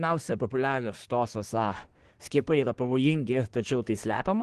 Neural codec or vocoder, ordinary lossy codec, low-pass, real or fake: codec, 24 kHz, 1 kbps, SNAC; Opus, 16 kbps; 10.8 kHz; fake